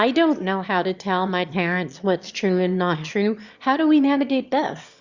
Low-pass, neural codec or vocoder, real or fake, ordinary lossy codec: 7.2 kHz; autoencoder, 22.05 kHz, a latent of 192 numbers a frame, VITS, trained on one speaker; fake; Opus, 64 kbps